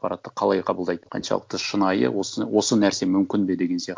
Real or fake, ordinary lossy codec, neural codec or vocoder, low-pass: real; none; none; none